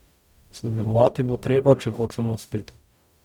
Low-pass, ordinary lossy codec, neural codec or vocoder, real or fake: 19.8 kHz; none; codec, 44.1 kHz, 0.9 kbps, DAC; fake